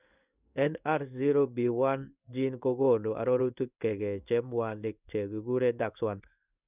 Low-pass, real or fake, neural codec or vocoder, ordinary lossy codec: 3.6 kHz; fake; codec, 16 kHz in and 24 kHz out, 1 kbps, XY-Tokenizer; none